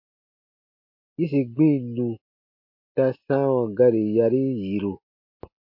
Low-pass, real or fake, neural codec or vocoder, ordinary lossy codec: 5.4 kHz; real; none; MP3, 32 kbps